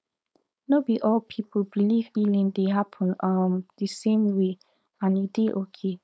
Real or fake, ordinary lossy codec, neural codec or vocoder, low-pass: fake; none; codec, 16 kHz, 4.8 kbps, FACodec; none